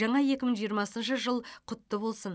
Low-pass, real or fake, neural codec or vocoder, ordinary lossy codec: none; real; none; none